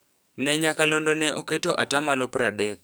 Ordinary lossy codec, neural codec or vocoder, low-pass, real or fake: none; codec, 44.1 kHz, 2.6 kbps, SNAC; none; fake